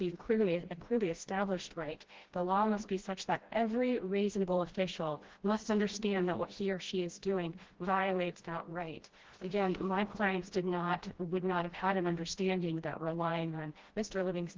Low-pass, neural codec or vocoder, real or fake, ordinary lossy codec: 7.2 kHz; codec, 16 kHz, 1 kbps, FreqCodec, smaller model; fake; Opus, 16 kbps